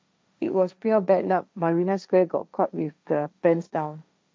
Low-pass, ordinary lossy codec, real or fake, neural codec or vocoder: none; none; fake; codec, 16 kHz, 1.1 kbps, Voila-Tokenizer